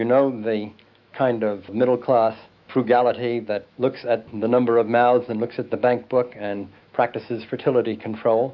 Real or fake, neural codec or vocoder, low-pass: fake; autoencoder, 48 kHz, 128 numbers a frame, DAC-VAE, trained on Japanese speech; 7.2 kHz